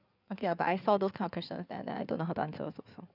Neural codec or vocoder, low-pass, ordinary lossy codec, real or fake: codec, 16 kHz in and 24 kHz out, 2.2 kbps, FireRedTTS-2 codec; 5.4 kHz; none; fake